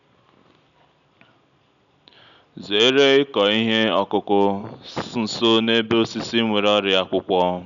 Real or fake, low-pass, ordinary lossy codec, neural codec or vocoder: real; 7.2 kHz; none; none